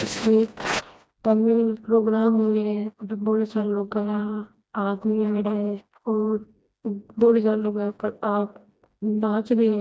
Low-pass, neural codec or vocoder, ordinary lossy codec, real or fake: none; codec, 16 kHz, 1 kbps, FreqCodec, smaller model; none; fake